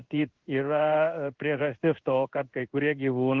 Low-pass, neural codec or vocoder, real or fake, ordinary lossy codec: 7.2 kHz; codec, 16 kHz in and 24 kHz out, 1 kbps, XY-Tokenizer; fake; Opus, 16 kbps